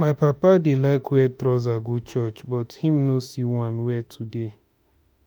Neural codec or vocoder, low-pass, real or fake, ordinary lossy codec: autoencoder, 48 kHz, 32 numbers a frame, DAC-VAE, trained on Japanese speech; none; fake; none